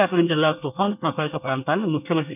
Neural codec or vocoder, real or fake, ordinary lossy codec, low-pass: codec, 24 kHz, 1 kbps, SNAC; fake; none; 3.6 kHz